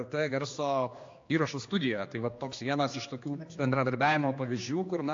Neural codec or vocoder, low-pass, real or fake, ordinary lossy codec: codec, 16 kHz, 2 kbps, X-Codec, HuBERT features, trained on general audio; 7.2 kHz; fake; AAC, 48 kbps